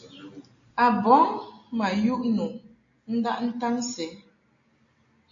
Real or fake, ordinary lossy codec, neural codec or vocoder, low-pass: real; AAC, 48 kbps; none; 7.2 kHz